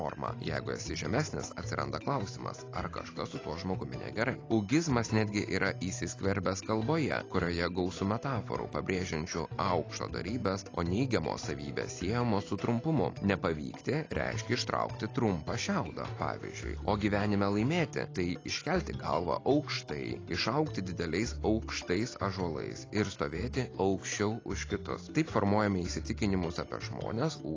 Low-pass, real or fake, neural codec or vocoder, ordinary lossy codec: 7.2 kHz; real; none; AAC, 32 kbps